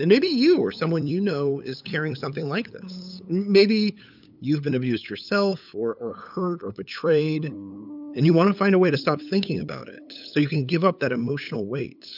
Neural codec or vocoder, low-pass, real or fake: codec, 16 kHz, 8 kbps, FunCodec, trained on LibriTTS, 25 frames a second; 5.4 kHz; fake